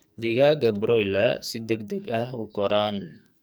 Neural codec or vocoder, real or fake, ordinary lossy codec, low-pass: codec, 44.1 kHz, 2.6 kbps, SNAC; fake; none; none